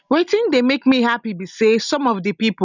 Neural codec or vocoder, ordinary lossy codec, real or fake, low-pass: none; none; real; 7.2 kHz